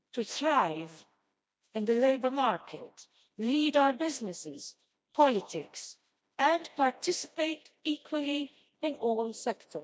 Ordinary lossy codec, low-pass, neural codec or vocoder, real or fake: none; none; codec, 16 kHz, 1 kbps, FreqCodec, smaller model; fake